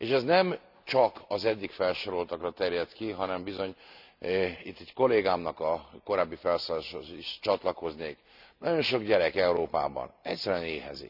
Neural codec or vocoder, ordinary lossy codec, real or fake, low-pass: none; none; real; 5.4 kHz